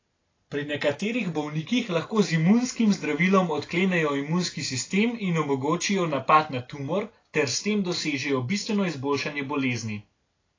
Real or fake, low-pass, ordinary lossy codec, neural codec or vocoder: real; 7.2 kHz; AAC, 32 kbps; none